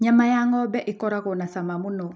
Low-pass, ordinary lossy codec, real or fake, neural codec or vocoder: none; none; real; none